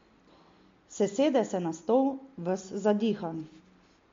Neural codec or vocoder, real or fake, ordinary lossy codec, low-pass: none; real; MP3, 48 kbps; 7.2 kHz